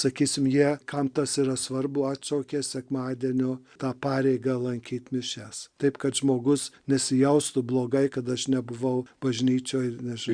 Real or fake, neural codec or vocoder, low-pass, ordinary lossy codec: real; none; 9.9 kHz; Opus, 64 kbps